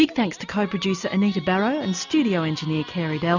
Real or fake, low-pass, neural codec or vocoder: real; 7.2 kHz; none